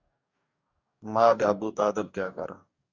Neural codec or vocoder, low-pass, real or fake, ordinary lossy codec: codec, 44.1 kHz, 2.6 kbps, DAC; 7.2 kHz; fake; Opus, 64 kbps